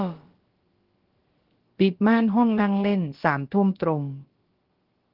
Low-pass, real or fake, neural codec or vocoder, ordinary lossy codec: 5.4 kHz; fake; codec, 16 kHz, about 1 kbps, DyCAST, with the encoder's durations; Opus, 16 kbps